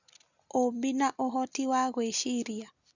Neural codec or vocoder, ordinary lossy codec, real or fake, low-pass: none; Opus, 64 kbps; real; 7.2 kHz